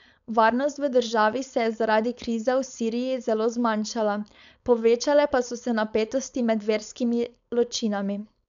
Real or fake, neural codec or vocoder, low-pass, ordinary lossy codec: fake; codec, 16 kHz, 4.8 kbps, FACodec; 7.2 kHz; MP3, 96 kbps